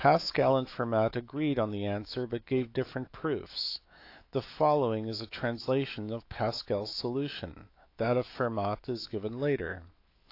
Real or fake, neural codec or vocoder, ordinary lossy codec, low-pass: fake; autoencoder, 48 kHz, 128 numbers a frame, DAC-VAE, trained on Japanese speech; AAC, 32 kbps; 5.4 kHz